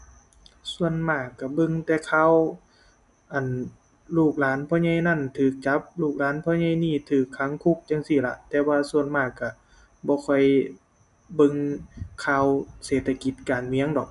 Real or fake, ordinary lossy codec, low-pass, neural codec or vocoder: real; none; 10.8 kHz; none